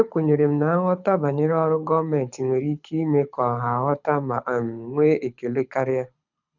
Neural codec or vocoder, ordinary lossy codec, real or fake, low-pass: codec, 24 kHz, 6 kbps, HILCodec; none; fake; 7.2 kHz